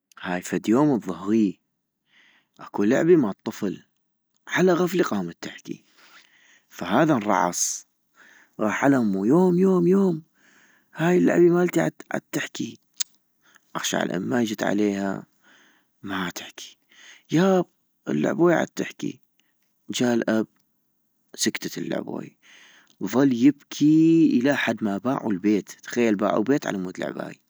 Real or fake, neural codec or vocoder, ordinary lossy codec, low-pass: real; none; none; none